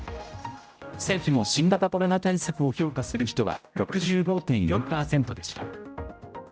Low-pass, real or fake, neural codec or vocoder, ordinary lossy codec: none; fake; codec, 16 kHz, 0.5 kbps, X-Codec, HuBERT features, trained on general audio; none